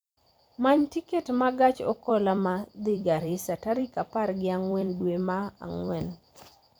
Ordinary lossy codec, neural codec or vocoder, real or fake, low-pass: none; vocoder, 44.1 kHz, 128 mel bands every 256 samples, BigVGAN v2; fake; none